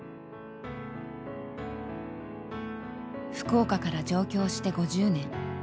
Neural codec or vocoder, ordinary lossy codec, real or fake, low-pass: none; none; real; none